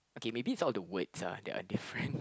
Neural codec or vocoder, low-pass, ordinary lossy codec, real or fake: none; none; none; real